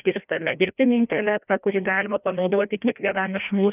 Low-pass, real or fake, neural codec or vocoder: 3.6 kHz; fake; codec, 16 kHz, 1 kbps, FreqCodec, larger model